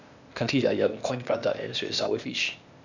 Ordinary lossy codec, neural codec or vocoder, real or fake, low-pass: none; codec, 16 kHz, 0.8 kbps, ZipCodec; fake; 7.2 kHz